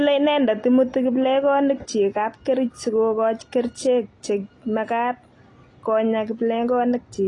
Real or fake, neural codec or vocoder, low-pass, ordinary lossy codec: real; none; 10.8 kHz; AAC, 32 kbps